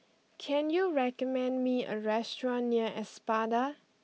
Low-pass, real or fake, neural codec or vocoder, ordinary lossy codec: none; real; none; none